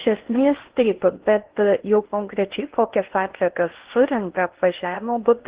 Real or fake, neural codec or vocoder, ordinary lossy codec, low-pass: fake; codec, 16 kHz in and 24 kHz out, 0.8 kbps, FocalCodec, streaming, 65536 codes; Opus, 16 kbps; 3.6 kHz